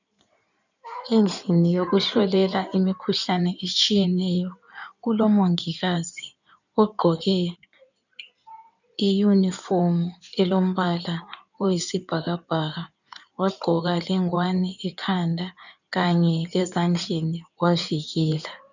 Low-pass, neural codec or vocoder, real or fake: 7.2 kHz; codec, 16 kHz in and 24 kHz out, 2.2 kbps, FireRedTTS-2 codec; fake